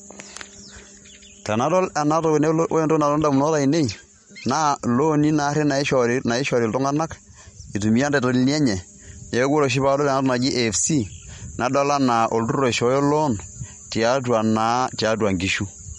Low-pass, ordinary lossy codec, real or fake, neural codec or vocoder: 19.8 kHz; MP3, 48 kbps; real; none